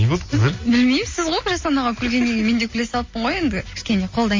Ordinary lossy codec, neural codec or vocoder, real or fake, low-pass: MP3, 32 kbps; none; real; 7.2 kHz